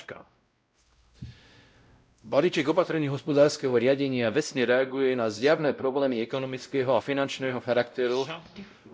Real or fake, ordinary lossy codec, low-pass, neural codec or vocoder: fake; none; none; codec, 16 kHz, 0.5 kbps, X-Codec, WavLM features, trained on Multilingual LibriSpeech